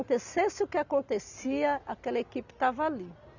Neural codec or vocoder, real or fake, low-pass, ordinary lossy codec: none; real; 7.2 kHz; none